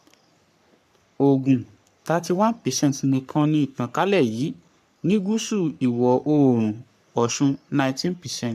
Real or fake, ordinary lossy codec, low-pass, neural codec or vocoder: fake; none; 14.4 kHz; codec, 44.1 kHz, 3.4 kbps, Pupu-Codec